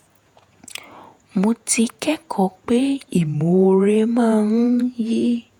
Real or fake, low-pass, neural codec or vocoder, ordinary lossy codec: fake; 19.8 kHz; vocoder, 48 kHz, 128 mel bands, Vocos; none